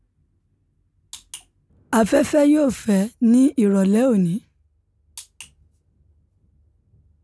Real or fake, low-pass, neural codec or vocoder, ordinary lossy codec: real; none; none; none